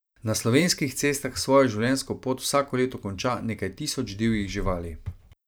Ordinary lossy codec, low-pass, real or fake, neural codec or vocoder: none; none; fake; vocoder, 44.1 kHz, 128 mel bands every 512 samples, BigVGAN v2